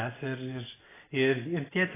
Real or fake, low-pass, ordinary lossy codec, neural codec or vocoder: real; 3.6 kHz; AAC, 16 kbps; none